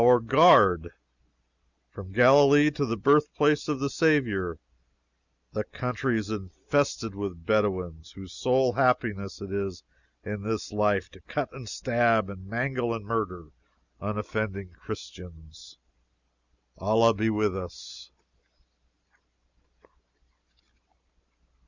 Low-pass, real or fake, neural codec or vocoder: 7.2 kHz; real; none